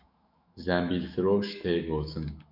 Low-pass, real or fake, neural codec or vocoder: 5.4 kHz; fake; autoencoder, 48 kHz, 128 numbers a frame, DAC-VAE, trained on Japanese speech